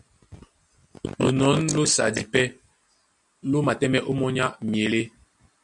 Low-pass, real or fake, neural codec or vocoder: 10.8 kHz; real; none